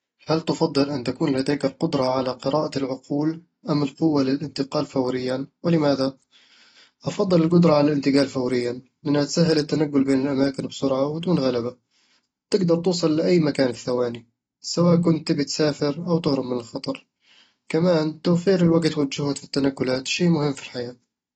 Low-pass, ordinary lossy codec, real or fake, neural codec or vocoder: 10.8 kHz; AAC, 24 kbps; real; none